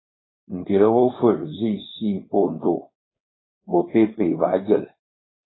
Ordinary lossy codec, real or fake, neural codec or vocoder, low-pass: AAC, 16 kbps; fake; codec, 16 kHz, 4.8 kbps, FACodec; 7.2 kHz